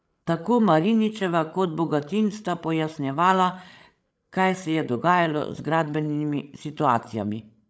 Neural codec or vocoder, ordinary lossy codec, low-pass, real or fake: codec, 16 kHz, 8 kbps, FreqCodec, larger model; none; none; fake